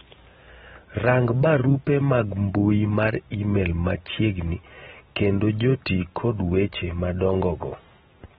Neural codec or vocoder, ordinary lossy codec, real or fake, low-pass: none; AAC, 16 kbps; real; 19.8 kHz